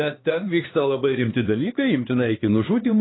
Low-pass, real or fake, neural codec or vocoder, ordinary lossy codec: 7.2 kHz; fake; codec, 16 kHz, 2 kbps, X-Codec, HuBERT features, trained on LibriSpeech; AAC, 16 kbps